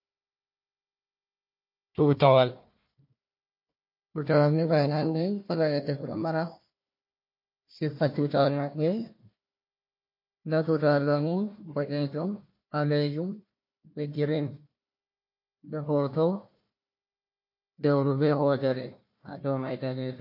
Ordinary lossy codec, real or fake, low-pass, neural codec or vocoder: MP3, 32 kbps; fake; 5.4 kHz; codec, 16 kHz, 1 kbps, FunCodec, trained on Chinese and English, 50 frames a second